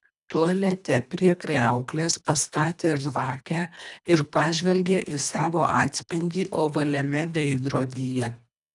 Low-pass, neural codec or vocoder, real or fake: 10.8 kHz; codec, 24 kHz, 1.5 kbps, HILCodec; fake